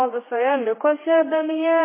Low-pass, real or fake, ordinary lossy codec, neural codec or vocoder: 3.6 kHz; fake; MP3, 16 kbps; codec, 16 kHz, 2 kbps, X-Codec, HuBERT features, trained on general audio